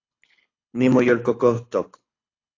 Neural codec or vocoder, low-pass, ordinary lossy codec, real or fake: codec, 24 kHz, 6 kbps, HILCodec; 7.2 kHz; AAC, 32 kbps; fake